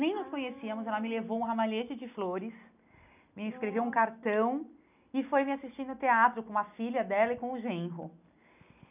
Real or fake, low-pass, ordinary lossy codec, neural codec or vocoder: real; 3.6 kHz; none; none